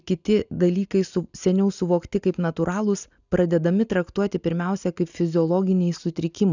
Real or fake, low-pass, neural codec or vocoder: real; 7.2 kHz; none